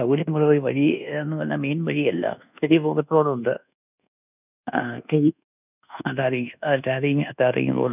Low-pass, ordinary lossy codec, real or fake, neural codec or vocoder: 3.6 kHz; none; fake; codec, 24 kHz, 1.2 kbps, DualCodec